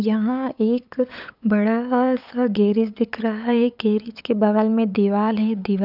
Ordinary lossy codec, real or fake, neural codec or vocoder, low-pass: none; fake; codec, 16 kHz, 8 kbps, FunCodec, trained on LibriTTS, 25 frames a second; 5.4 kHz